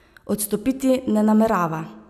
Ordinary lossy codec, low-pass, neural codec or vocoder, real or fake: none; 14.4 kHz; none; real